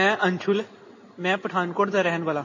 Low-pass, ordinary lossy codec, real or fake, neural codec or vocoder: 7.2 kHz; MP3, 32 kbps; real; none